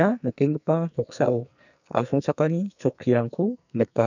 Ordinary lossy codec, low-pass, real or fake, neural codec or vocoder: none; 7.2 kHz; fake; codec, 44.1 kHz, 2.6 kbps, SNAC